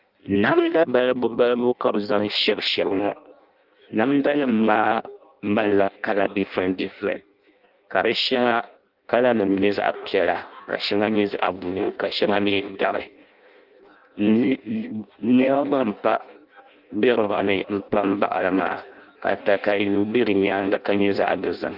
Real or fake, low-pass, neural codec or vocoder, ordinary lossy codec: fake; 5.4 kHz; codec, 16 kHz in and 24 kHz out, 0.6 kbps, FireRedTTS-2 codec; Opus, 24 kbps